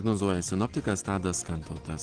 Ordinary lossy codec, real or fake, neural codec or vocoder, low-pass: Opus, 16 kbps; real; none; 9.9 kHz